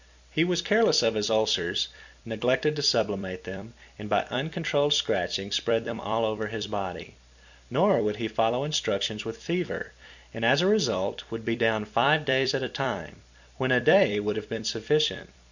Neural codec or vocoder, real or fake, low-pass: vocoder, 44.1 kHz, 128 mel bands every 256 samples, BigVGAN v2; fake; 7.2 kHz